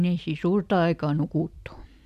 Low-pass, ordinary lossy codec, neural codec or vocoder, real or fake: 14.4 kHz; none; none; real